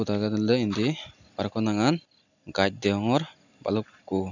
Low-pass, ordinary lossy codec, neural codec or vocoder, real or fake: 7.2 kHz; none; none; real